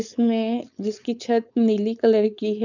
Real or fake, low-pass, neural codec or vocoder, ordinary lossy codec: fake; 7.2 kHz; codec, 16 kHz, 4.8 kbps, FACodec; none